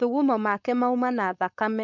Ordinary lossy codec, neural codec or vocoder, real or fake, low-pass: none; codec, 16 kHz, 4.8 kbps, FACodec; fake; 7.2 kHz